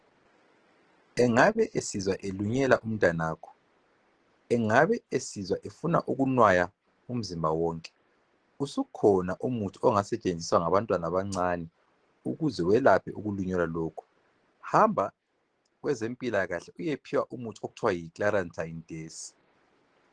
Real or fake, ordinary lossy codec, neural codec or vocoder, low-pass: real; Opus, 16 kbps; none; 9.9 kHz